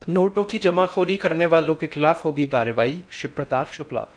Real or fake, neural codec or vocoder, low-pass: fake; codec, 16 kHz in and 24 kHz out, 0.6 kbps, FocalCodec, streaming, 4096 codes; 9.9 kHz